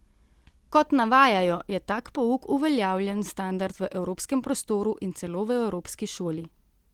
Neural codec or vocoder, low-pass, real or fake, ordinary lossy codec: none; 19.8 kHz; real; Opus, 16 kbps